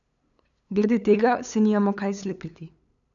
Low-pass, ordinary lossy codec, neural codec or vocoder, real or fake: 7.2 kHz; none; codec, 16 kHz, 8 kbps, FunCodec, trained on LibriTTS, 25 frames a second; fake